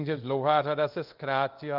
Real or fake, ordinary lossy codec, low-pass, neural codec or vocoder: fake; Opus, 32 kbps; 5.4 kHz; codec, 24 kHz, 0.5 kbps, DualCodec